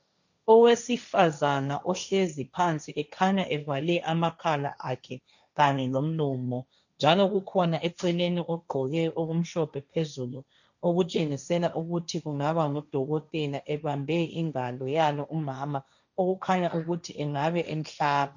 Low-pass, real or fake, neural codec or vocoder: 7.2 kHz; fake; codec, 16 kHz, 1.1 kbps, Voila-Tokenizer